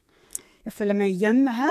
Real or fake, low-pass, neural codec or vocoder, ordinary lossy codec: fake; 14.4 kHz; codec, 32 kHz, 1.9 kbps, SNAC; none